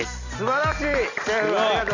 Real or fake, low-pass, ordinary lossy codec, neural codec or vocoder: real; 7.2 kHz; none; none